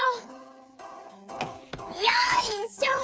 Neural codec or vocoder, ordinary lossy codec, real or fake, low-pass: codec, 16 kHz, 4 kbps, FreqCodec, smaller model; none; fake; none